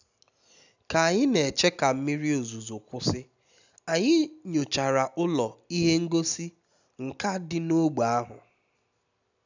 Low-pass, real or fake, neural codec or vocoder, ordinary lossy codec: 7.2 kHz; real; none; none